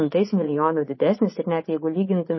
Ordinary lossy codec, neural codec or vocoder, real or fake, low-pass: MP3, 24 kbps; vocoder, 24 kHz, 100 mel bands, Vocos; fake; 7.2 kHz